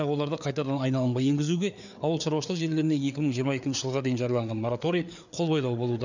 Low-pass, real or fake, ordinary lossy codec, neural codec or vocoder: 7.2 kHz; fake; none; codec, 16 kHz, 4 kbps, FreqCodec, larger model